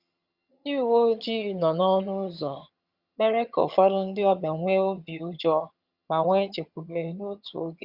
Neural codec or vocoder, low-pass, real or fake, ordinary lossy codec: vocoder, 22.05 kHz, 80 mel bands, HiFi-GAN; 5.4 kHz; fake; Opus, 64 kbps